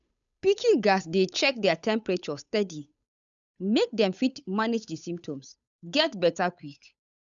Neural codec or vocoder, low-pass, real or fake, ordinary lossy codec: codec, 16 kHz, 8 kbps, FunCodec, trained on Chinese and English, 25 frames a second; 7.2 kHz; fake; none